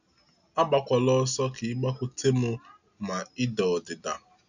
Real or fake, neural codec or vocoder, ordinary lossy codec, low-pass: real; none; none; 7.2 kHz